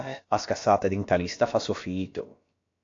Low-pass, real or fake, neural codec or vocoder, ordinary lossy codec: 7.2 kHz; fake; codec, 16 kHz, about 1 kbps, DyCAST, with the encoder's durations; AAC, 48 kbps